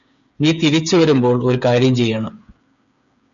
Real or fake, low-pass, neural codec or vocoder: fake; 7.2 kHz; codec, 16 kHz, 8 kbps, FreqCodec, smaller model